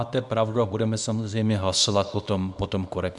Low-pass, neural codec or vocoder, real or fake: 10.8 kHz; codec, 24 kHz, 0.9 kbps, WavTokenizer, small release; fake